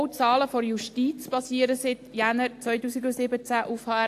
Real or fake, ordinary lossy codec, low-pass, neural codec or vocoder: real; AAC, 64 kbps; 14.4 kHz; none